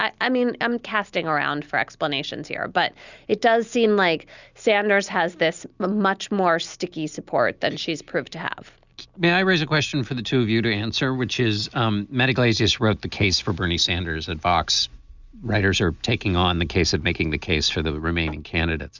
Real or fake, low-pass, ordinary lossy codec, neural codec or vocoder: real; 7.2 kHz; Opus, 64 kbps; none